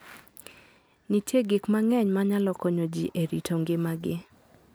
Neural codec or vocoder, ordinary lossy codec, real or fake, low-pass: none; none; real; none